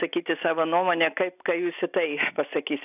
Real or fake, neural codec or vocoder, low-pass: real; none; 3.6 kHz